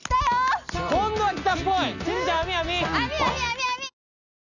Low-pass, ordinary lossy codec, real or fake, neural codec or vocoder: 7.2 kHz; none; real; none